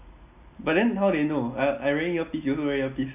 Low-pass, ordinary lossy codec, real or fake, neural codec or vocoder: 3.6 kHz; none; real; none